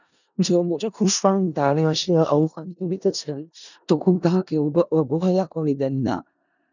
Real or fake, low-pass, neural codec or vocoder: fake; 7.2 kHz; codec, 16 kHz in and 24 kHz out, 0.4 kbps, LongCat-Audio-Codec, four codebook decoder